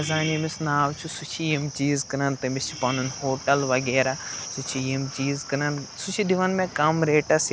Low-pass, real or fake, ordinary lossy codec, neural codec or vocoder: none; real; none; none